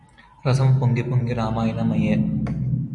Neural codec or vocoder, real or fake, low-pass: none; real; 10.8 kHz